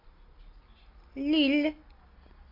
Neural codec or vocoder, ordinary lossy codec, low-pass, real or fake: none; AAC, 32 kbps; 5.4 kHz; real